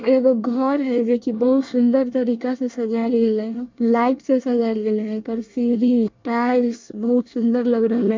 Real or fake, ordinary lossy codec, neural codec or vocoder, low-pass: fake; MP3, 64 kbps; codec, 24 kHz, 1 kbps, SNAC; 7.2 kHz